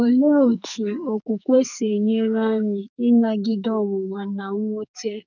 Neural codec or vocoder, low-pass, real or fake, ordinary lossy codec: codec, 44.1 kHz, 2.6 kbps, SNAC; 7.2 kHz; fake; none